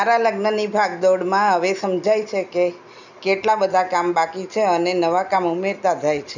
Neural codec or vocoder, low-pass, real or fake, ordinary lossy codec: none; 7.2 kHz; real; none